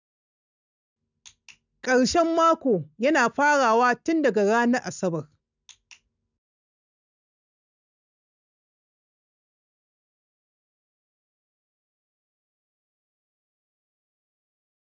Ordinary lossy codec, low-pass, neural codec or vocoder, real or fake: none; 7.2 kHz; none; real